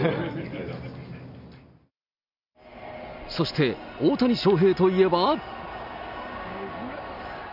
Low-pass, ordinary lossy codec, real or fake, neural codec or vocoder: 5.4 kHz; none; real; none